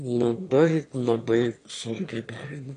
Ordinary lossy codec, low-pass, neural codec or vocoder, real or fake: AAC, 48 kbps; 9.9 kHz; autoencoder, 22.05 kHz, a latent of 192 numbers a frame, VITS, trained on one speaker; fake